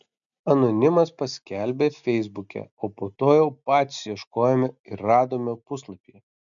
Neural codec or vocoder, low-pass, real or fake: none; 7.2 kHz; real